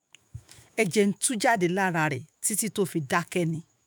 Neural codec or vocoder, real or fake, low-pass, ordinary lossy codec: autoencoder, 48 kHz, 128 numbers a frame, DAC-VAE, trained on Japanese speech; fake; none; none